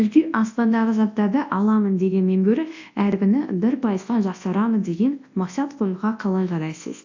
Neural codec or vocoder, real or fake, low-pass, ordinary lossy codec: codec, 24 kHz, 0.9 kbps, WavTokenizer, large speech release; fake; 7.2 kHz; AAC, 48 kbps